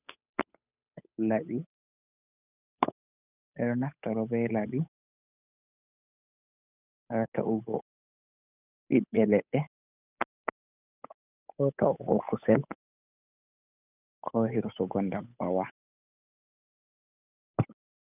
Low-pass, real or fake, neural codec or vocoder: 3.6 kHz; fake; codec, 16 kHz, 8 kbps, FunCodec, trained on Chinese and English, 25 frames a second